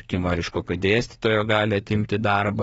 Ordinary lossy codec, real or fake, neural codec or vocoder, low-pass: AAC, 24 kbps; fake; codec, 32 kHz, 1.9 kbps, SNAC; 14.4 kHz